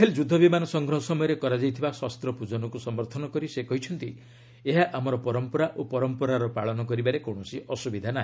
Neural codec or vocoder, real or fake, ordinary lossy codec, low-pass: none; real; none; none